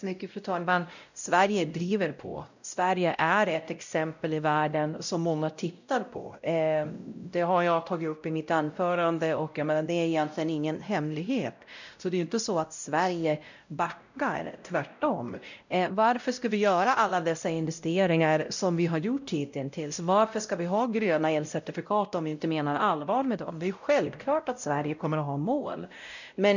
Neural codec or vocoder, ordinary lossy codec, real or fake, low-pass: codec, 16 kHz, 0.5 kbps, X-Codec, WavLM features, trained on Multilingual LibriSpeech; none; fake; 7.2 kHz